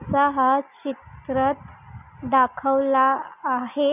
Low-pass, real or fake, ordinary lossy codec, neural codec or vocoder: 3.6 kHz; real; none; none